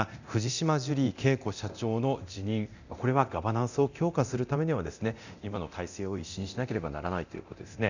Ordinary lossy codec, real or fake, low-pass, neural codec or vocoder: none; fake; 7.2 kHz; codec, 24 kHz, 0.9 kbps, DualCodec